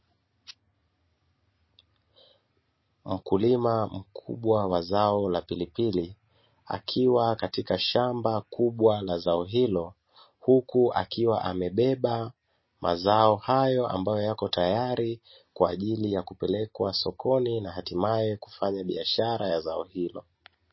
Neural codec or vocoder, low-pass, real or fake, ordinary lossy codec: none; 7.2 kHz; real; MP3, 24 kbps